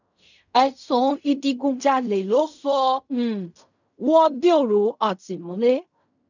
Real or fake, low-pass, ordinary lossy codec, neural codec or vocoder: fake; 7.2 kHz; none; codec, 16 kHz in and 24 kHz out, 0.4 kbps, LongCat-Audio-Codec, fine tuned four codebook decoder